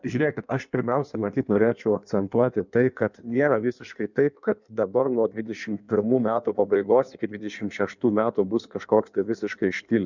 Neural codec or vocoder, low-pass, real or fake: codec, 16 kHz in and 24 kHz out, 1.1 kbps, FireRedTTS-2 codec; 7.2 kHz; fake